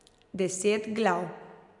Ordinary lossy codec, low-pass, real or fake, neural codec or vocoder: none; 10.8 kHz; real; none